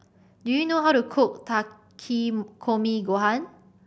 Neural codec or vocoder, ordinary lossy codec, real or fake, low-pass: none; none; real; none